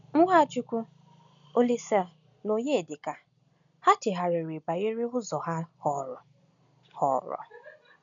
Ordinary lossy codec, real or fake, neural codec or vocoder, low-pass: none; real; none; 7.2 kHz